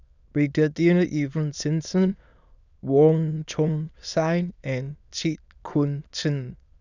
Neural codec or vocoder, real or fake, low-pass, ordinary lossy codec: autoencoder, 22.05 kHz, a latent of 192 numbers a frame, VITS, trained on many speakers; fake; 7.2 kHz; none